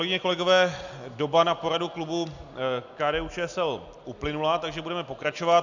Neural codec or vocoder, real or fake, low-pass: none; real; 7.2 kHz